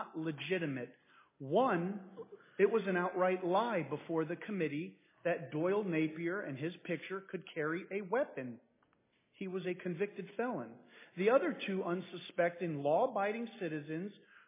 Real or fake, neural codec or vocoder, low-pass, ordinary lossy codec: real; none; 3.6 kHz; MP3, 16 kbps